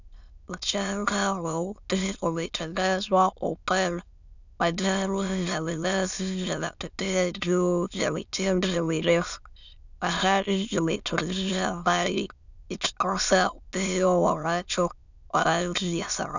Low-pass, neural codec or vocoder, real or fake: 7.2 kHz; autoencoder, 22.05 kHz, a latent of 192 numbers a frame, VITS, trained on many speakers; fake